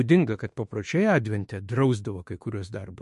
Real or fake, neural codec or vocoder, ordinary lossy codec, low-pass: fake; autoencoder, 48 kHz, 32 numbers a frame, DAC-VAE, trained on Japanese speech; MP3, 48 kbps; 14.4 kHz